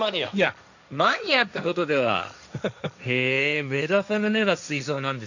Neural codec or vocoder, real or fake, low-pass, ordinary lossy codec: codec, 16 kHz, 1.1 kbps, Voila-Tokenizer; fake; 7.2 kHz; none